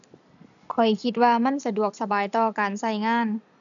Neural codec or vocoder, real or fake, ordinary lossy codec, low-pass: none; real; none; 7.2 kHz